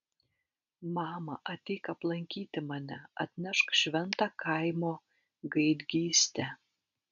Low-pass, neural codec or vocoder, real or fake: 7.2 kHz; none; real